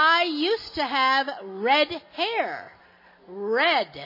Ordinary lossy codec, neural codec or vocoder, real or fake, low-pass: MP3, 24 kbps; none; real; 5.4 kHz